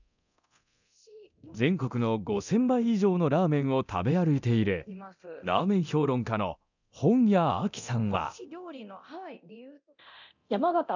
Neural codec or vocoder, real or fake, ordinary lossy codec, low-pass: codec, 24 kHz, 0.9 kbps, DualCodec; fake; none; 7.2 kHz